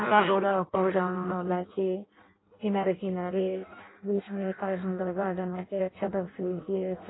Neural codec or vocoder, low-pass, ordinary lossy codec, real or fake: codec, 16 kHz in and 24 kHz out, 0.6 kbps, FireRedTTS-2 codec; 7.2 kHz; AAC, 16 kbps; fake